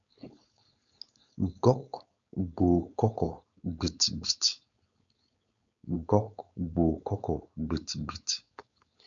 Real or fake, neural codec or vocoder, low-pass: fake; codec, 16 kHz, 4.8 kbps, FACodec; 7.2 kHz